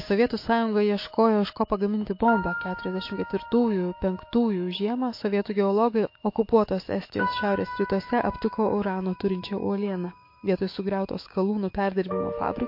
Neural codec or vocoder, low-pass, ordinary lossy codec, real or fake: autoencoder, 48 kHz, 128 numbers a frame, DAC-VAE, trained on Japanese speech; 5.4 kHz; MP3, 32 kbps; fake